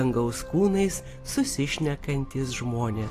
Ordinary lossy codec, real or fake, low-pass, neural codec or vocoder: AAC, 48 kbps; real; 14.4 kHz; none